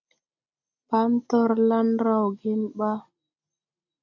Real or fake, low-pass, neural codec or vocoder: real; 7.2 kHz; none